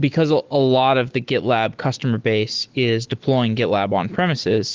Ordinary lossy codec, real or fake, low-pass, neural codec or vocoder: Opus, 16 kbps; fake; 7.2 kHz; autoencoder, 48 kHz, 128 numbers a frame, DAC-VAE, trained on Japanese speech